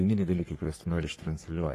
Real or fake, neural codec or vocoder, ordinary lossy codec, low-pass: fake; codec, 44.1 kHz, 3.4 kbps, Pupu-Codec; AAC, 48 kbps; 14.4 kHz